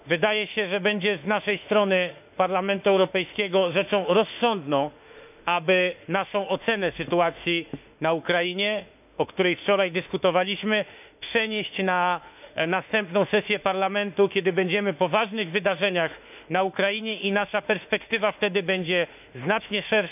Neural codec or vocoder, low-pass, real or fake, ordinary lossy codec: autoencoder, 48 kHz, 32 numbers a frame, DAC-VAE, trained on Japanese speech; 3.6 kHz; fake; none